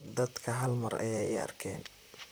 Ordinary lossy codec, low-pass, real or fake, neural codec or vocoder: none; none; fake; vocoder, 44.1 kHz, 128 mel bands, Pupu-Vocoder